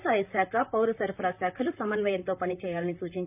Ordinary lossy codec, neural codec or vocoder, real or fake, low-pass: none; vocoder, 44.1 kHz, 128 mel bands, Pupu-Vocoder; fake; 3.6 kHz